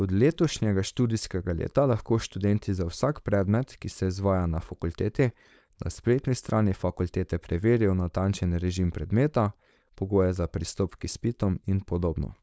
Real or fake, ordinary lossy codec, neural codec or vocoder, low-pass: fake; none; codec, 16 kHz, 4.8 kbps, FACodec; none